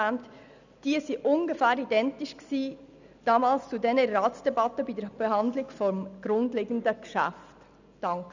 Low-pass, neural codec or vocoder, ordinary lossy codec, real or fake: 7.2 kHz; none; none; real